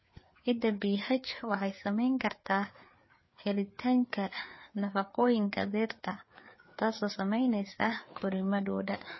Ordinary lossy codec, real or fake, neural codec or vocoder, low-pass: MP3, 24 kbps; fake; codec, 16 kHz, 4 kbps, FreqCodec, larger model; 7.2 kHz